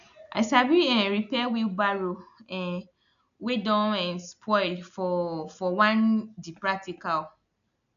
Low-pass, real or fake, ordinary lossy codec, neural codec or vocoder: 7.2 kHz; real; none; none